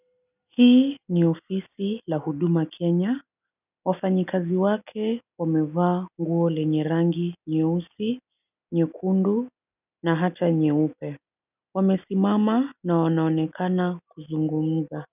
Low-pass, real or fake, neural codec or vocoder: 3.6 kHz; real; none